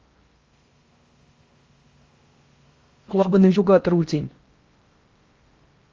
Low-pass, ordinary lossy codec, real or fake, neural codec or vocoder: 7.2 kHz; Opus, 32 kbps; fake; codec, 16 kHz in and 24 kHz out, 0.6 kbps, FocalCodec, streaming, 4096 codes